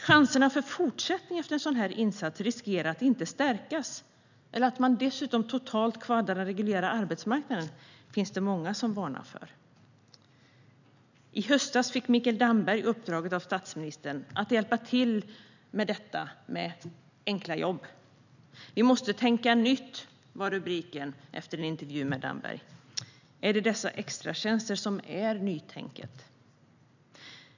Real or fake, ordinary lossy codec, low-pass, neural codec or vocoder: real; none; 7.2 kHz; none